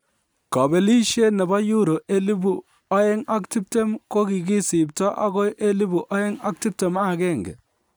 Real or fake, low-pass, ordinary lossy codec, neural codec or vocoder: real; none; none; none